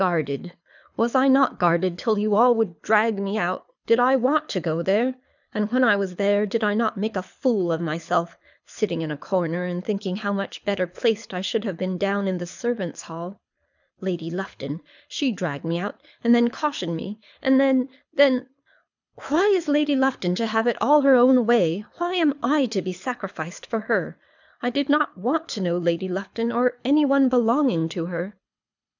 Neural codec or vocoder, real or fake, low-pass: codec, 24 kHz, 6 kbps, HILCodec; fake; 7.2 kHz